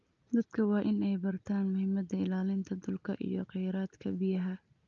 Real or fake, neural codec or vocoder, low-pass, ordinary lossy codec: real; none; 7.2 kHz; Opus, 24 kbps